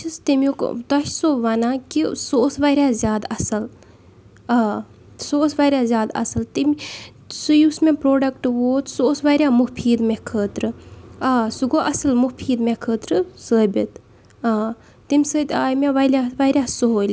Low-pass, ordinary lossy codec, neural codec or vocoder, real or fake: none; none; none; real